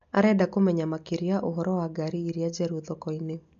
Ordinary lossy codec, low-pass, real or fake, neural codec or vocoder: AAC, 64 kbps; 7.2 kHz; real; none